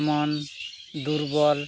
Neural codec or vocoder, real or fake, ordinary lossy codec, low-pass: none; real; none; none